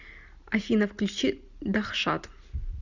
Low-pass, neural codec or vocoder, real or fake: 7.2 kHz; none; real